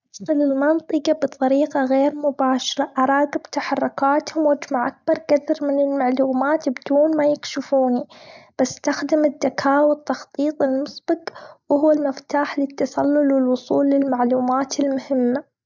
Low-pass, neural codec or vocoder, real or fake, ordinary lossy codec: 7.2 kHz; none; real; none